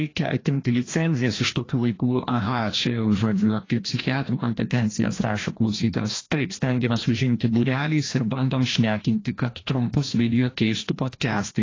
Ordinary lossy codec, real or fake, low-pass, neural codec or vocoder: AAC, 32 kbps; fake; 7.2 kHz; codec, 16 kHz, 1 kbps, FreqCodec, larger model